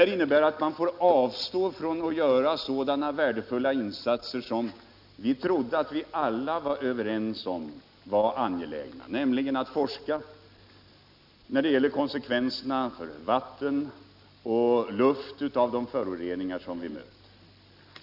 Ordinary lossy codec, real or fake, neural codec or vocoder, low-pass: none; real; none; 5.4 kHz